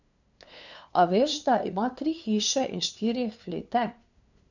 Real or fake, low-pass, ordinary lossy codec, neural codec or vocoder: fake; 7.2 kHz; none; codec, 16 kHz, 2 kbps, FunCodec, trained on LibriTTS, 25 frames a second